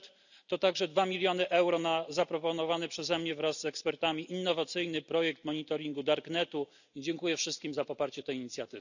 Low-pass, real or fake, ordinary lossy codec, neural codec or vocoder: 7.2 kHz; real; none; none